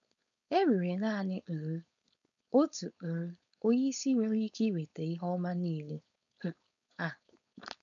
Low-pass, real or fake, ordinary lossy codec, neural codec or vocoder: 7.2 kHz; fake; none; codec, 16 kHz, 4.8 kbps, FACodec